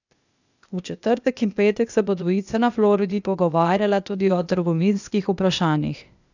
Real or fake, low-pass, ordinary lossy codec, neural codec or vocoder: fake; 7.2 kHz; none; codec, 16 kHz, 0.8 kbps, ZipCodec